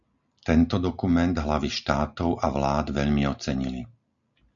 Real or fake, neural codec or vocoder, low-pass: real; none; 7.2 kHz